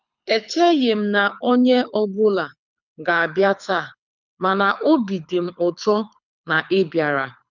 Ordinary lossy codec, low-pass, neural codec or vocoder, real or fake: none; 7.2 kHz; codec, 24 kHz, 6 kbps, HILCodec; fake